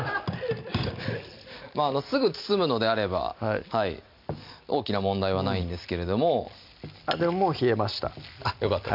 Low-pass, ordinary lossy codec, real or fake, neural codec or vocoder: 5.4 kHz; MP3, 48 kbps; real; none